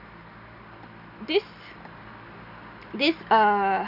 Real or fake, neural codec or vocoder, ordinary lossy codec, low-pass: real; none; none; 5.4 kHz